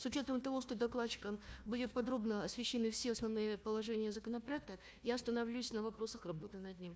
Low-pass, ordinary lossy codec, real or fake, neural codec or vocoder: none; none; fake; codec, 16 kHz, 1 kbps, FunCodec, trained on Chinese and English, 50 frames a second